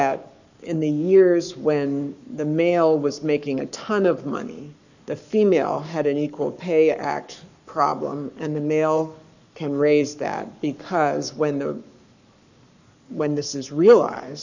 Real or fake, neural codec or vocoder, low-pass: fake; codec, 44.1 kHz, 7.8 kbps, Pupu-Codec; 7.2 kHz